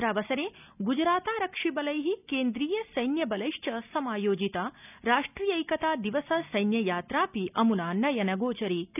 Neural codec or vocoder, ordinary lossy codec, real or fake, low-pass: none; none; real; 3.6 kHz